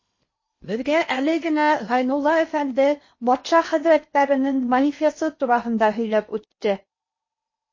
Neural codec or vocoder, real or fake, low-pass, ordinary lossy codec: codec, 16 kHz in and 24 kHz out, 0.8 kbps, FocalCodec, streaming, 65536 codes; fake; 7.2 kHz; MP3, 32 kbps